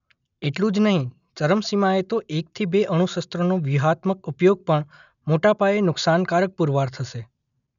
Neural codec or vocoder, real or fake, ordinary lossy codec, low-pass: none; real; none; 7.2 kHz